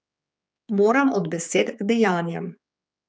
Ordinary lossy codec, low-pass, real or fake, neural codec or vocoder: none; none; fake; codec, 16 kHz, 4 kbps, X-Codec, HuBERT features, trained on general audio